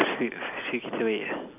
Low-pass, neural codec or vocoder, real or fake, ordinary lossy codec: 3.6 kHz; none; real; none